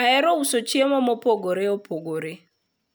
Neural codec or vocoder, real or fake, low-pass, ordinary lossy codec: none; real; none; none